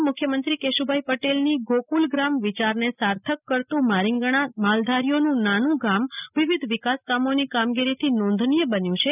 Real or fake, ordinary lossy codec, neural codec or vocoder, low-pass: real; none; none; 3.6 kHz